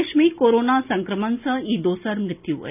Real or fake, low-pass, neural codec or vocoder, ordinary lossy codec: real; 3.6 kHz; none; none